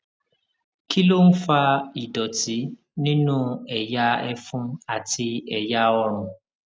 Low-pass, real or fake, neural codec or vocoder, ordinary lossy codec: none; real; none; none